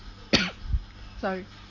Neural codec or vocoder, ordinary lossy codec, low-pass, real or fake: none; none; 7.2 kHz; real